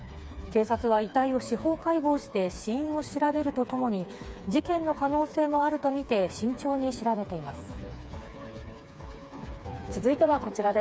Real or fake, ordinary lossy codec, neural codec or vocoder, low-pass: fake; none; codec, 16 kHz, 4 kbps, FreqCodec, smaller model; none